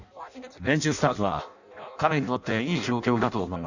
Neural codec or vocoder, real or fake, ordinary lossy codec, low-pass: codec, 16 kHz in and 24 kHz out, 0.6 kbps, FireRedTTS-2 codec; fake; none; 7.2 kHz